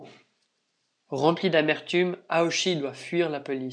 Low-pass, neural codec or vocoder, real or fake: 9.9 kHz; none; real